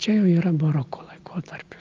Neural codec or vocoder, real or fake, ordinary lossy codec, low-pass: none; real; Opus, 32 kbps; 7.2 kHz